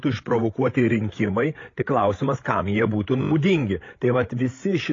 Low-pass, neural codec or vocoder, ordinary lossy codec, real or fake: 7.2 kHz; codec, 16 kHz, 16 kbps, FreqCodec, larger model; AAC, 32 kbps; fake